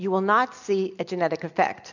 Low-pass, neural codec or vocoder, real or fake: 7.2 kHz; none; real